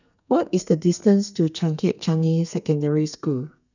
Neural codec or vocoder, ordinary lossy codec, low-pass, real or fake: codec, 32 kHz, 1.9 kbps, SNAC; none; 7.2 kHz; fake